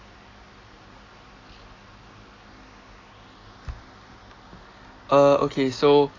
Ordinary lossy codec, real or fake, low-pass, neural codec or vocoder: AAC, 32 kbps; real; 7.2 kHz; none